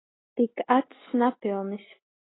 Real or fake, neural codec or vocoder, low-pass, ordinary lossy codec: real; none; 7.2 kHz; AAC, 16 kbps